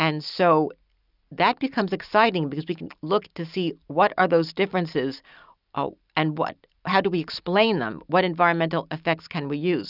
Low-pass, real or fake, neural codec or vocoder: 5.4 kHz; real; none